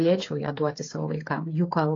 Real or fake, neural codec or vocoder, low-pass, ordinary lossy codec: fake; codec, 16 kHz, 8 kbps, FreqCodec, smaller model; 7.2 kHz; AAC, 32 kbps